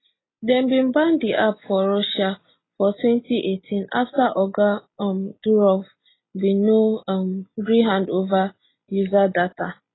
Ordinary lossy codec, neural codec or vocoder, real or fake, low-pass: AAC, 16 kbps; none; real; 7.2 kHz